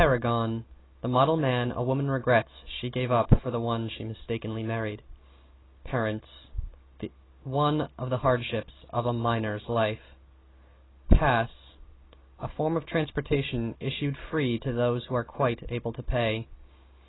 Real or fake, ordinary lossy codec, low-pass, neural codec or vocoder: real; AAC, 16 kbps; 7.2 kHz; none